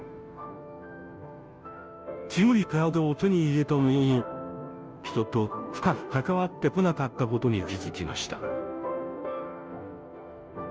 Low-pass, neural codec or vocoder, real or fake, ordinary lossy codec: none; codec, 16 kHz, 0.5 kbps, FunCodec, trained on Chinese and English, 25 frames a second; fake; none